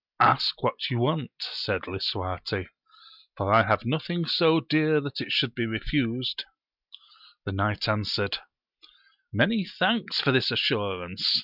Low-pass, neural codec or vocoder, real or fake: 5.4 kHz; none; real